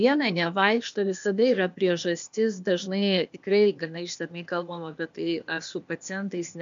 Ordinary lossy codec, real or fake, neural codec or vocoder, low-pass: MP3, 64 kbps; fake; codec, 16 kHz, 0.8 kbps, ZipCodec; 7.2 kHz